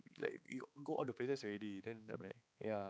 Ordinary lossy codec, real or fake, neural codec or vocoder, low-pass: none; fake; codec, 16 kHz, 4 kbps, X-Codec, HuBERT features, trained on balanced general audio; none